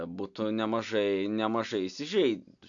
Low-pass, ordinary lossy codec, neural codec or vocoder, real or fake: 7.2 kHz; AAC, 64 kbps; none; real